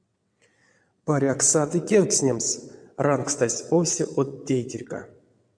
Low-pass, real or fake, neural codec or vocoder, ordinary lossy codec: 9.9 kHz; fake; vocoder, 22.05 kHz, 80 mel bands, WaveNeXt; AAC, 64 kbps